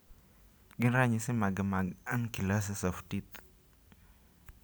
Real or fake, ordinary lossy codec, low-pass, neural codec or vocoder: real; none; none; none